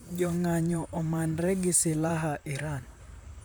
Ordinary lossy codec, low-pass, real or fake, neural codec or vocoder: none; none; fake; vocoder, 44.1 kHz, 128 mel bands, Pupu-Vocoder